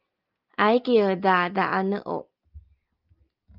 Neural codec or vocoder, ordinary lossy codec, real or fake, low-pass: none; Opus, 24 kbps; real; 5.4 kHz